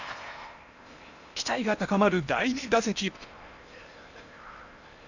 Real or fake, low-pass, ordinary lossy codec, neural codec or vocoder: fake; 7.2 kHz; none; codec, 16 kHz in and 24 kHz out, 0.8 kbps, FocalCodec, streaming, 65536 codes